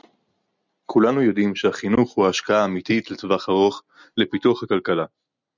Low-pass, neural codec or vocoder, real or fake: 7.2 kHz; none; real